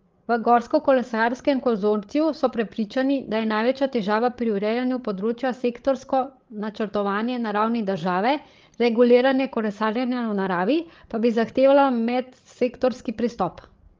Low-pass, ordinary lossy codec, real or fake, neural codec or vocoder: 7.2 kHz; Opus, 32 kbps; fake; codec, 16 kHz, 8 kbps, FreqCodec, larger model